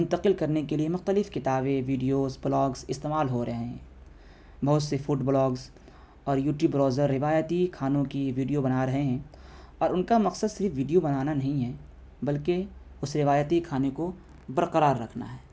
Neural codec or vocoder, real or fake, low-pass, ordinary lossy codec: none; real; none; none